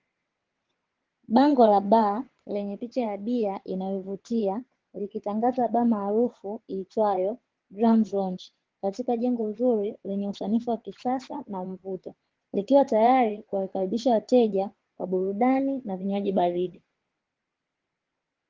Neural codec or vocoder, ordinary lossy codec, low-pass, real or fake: vocoder, 22.05 kHz, 80 mel bands, WaveNeXt; Opus, 16 kbps; 7.2 kHz; fake